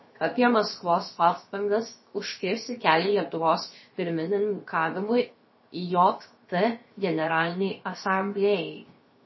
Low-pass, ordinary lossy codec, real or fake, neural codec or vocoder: 7.2 kHz; MP3, 24 kbps; fake; codec, 16 kHz, 0.7 kbps, FocalCodec